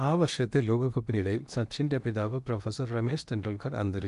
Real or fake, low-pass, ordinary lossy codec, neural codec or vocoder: fake; 10.8 kHz; none; codec, 16 kHz in and 24 kHz out, 0.8 kbps, FocalCodec, streaming, 65536 codes